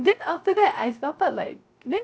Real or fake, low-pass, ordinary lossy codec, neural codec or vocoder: fake; none; none; codec, 16 kHz, 0.3 kbps, FocalCodec